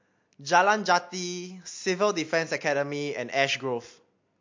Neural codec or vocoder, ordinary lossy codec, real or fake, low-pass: none; MP3, 48 kbps; real; 7.2 kHz